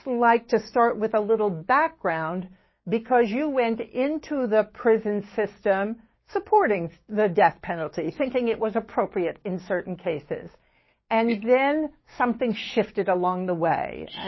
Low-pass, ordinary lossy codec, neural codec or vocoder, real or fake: 7.2 kHz; MP3, 24 kbps; codec, 16 kHz, 6 kbps, DAC; fake